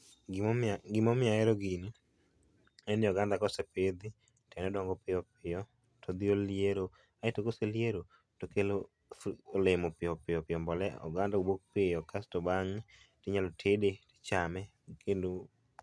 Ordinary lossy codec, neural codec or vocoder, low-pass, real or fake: none; none; none; real